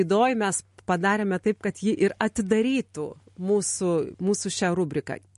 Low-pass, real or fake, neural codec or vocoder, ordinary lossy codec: 14.4 kHz; real; none; MP3, 48 kbps